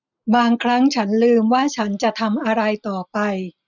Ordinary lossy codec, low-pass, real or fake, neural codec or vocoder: none; 7.2 kHz; real; none